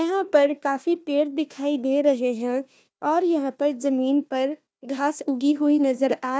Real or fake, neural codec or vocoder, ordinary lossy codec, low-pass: fake; codec, 16 kHz, 1 kbps, FunCodec, trained on Chinese and English, 50 frames a second; none; none